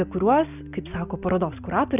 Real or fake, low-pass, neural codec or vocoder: real; 3.6 kHz; none